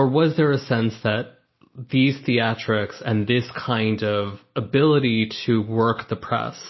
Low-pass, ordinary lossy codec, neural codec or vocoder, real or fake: 7.2 kHz; MP3, 24 kbps; none; real